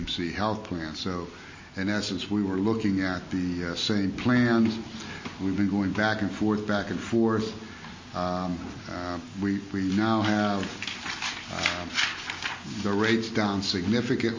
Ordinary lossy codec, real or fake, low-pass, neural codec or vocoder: MP3, 32 kbps; real; 7.2 kHz; none